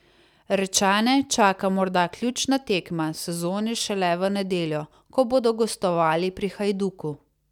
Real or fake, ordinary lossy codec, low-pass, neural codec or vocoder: real; none; 19.8 kHz; none